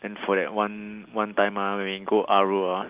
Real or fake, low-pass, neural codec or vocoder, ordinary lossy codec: real; 3.6 kHz; none; Opus, 32 kbps